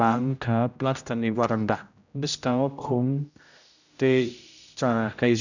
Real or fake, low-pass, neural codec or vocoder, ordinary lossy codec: fake; 7.2 kHz; codec, 16 kHz, 0.5 kbps, X-Codec, HuBERT features, trained on general audio; none